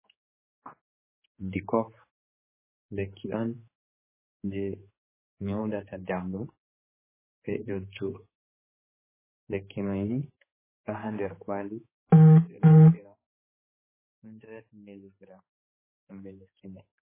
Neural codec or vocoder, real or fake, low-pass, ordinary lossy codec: codec, 16 kHz, 4 kbps, X-Codec, HuBERT features, trained on general audio; fake; 3.6 kHz; MP3, 16 kbps